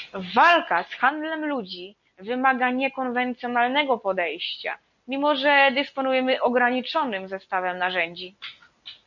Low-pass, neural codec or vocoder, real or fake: 7.2 kHz; none; real